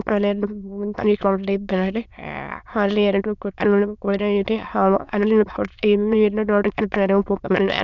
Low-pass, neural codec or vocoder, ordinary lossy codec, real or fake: 7.2 kHz; autoencoder, 22.05 kHz, a latent of 192 numbers a frame, VITS, trained on many speakers; none; fake